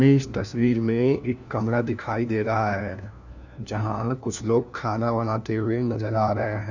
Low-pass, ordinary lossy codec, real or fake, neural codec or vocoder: 7.2 kHz; none; fake; codec, 16 kHz, 1 kbps, FunCodec, trained on LibriTTS, 50 frames a second